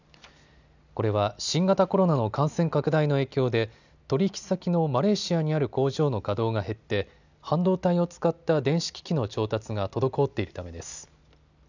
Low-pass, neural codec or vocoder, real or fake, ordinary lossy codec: 7.2 kHz; none; real; none